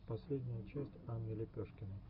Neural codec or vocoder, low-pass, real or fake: none; 5.4 kHz; real